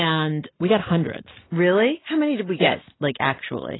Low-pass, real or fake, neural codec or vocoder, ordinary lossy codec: 7.2 kHz; real; none; AAC, 16 kbps